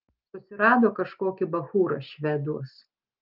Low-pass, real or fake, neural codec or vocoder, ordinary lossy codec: 5.4 kHz; real; none; Opus, 32 kbps